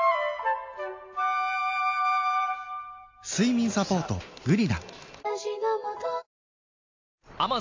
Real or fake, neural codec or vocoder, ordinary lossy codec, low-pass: real; none; MP3, 48 kbps; 7.2 kHz